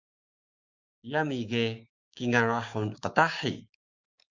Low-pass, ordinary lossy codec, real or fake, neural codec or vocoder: 7.2 kHz; Opus, 64 kbps; fake; codec, 16 kHz, 6 kbps, DAC